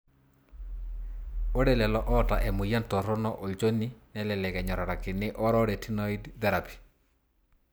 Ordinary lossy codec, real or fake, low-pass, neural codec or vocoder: none; real; none; none